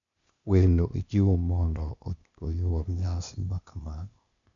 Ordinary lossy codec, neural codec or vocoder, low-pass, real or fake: none; codec, 16 kHz, 0.8 kbps, ZipCodec; 7.2 kHz; fake